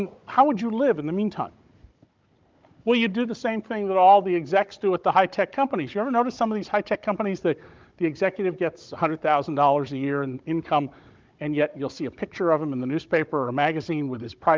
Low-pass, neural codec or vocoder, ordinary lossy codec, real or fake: 7.2 kHz; codec, 16 kHz, 16 kbps, FunCodec, trained on Chinese and English, 50 frames a second; Opus, 24 kbps; fake